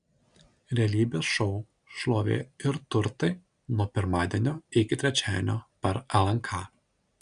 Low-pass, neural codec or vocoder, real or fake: 9.9 kHz; none; real